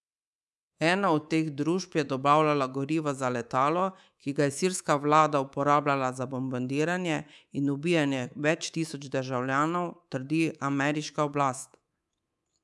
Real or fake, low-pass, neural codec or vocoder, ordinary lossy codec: fake; none; codec, 24 kHz, 3.1 kbps, DualCodec; none